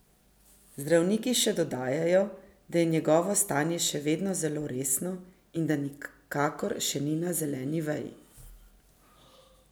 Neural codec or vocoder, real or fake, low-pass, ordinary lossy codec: none; real; none; none